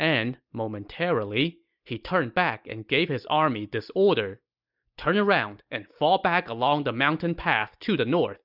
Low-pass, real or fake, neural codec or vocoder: 5.4 kHz; real; none